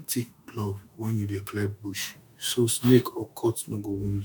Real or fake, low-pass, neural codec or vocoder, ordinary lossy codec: fake; none; autoencoder, 48 kHz, 32 numbers a frame, DAC-VAE, trained on Japanese speech; none